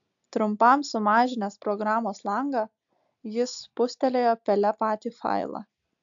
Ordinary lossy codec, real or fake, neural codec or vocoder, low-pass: AAC, 64 kbps; real; none; 7.2 kHz